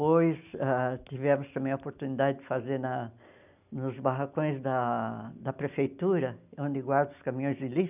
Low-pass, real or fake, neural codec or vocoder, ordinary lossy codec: 3.6 kHz; real; none; none